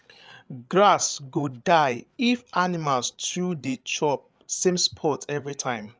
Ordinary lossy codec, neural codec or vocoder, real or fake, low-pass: none; codec, 16 kHz, 8 kbps, FreqCodec, larger model; fake; none